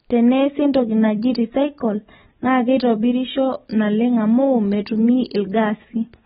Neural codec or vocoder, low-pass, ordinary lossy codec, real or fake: none; 7.2 kHz; AAC, 16 kbps; real